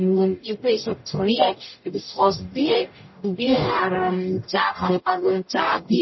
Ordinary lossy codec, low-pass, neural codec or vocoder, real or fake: MP3, 24 kbps; 7.2 kHz; codec, 44.1 kHz, 0.9 kbps, DAC; fake